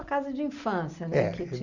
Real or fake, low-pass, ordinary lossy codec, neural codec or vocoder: real; 7.2 kHz; none; none